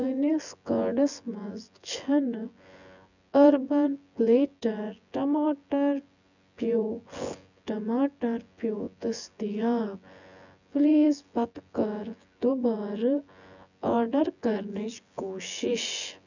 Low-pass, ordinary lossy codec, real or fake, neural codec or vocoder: 7.2 kHz; none; fake; vocoder, 24 kHz, 100 mel bands, Vocos